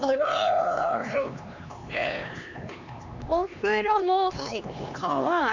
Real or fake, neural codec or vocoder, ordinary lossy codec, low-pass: fake; codec, 16 kHz, 2 kbps, X-Codec, HuBERT features, trained on LibriSpeech; none; 7.2 kHz